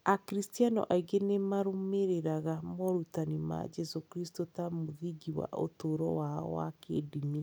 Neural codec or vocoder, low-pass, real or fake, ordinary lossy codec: none; none; real; none